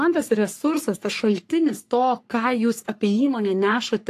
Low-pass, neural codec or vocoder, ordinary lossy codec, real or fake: 14.4 kHz; codec, 44.1 kHz, 3.4 kbps, Pupu-Codec; AAC, 64 kbps; fake